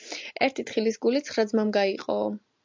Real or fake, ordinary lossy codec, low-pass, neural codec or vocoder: real; MP3, 64 kbps; 7.2 kHz; none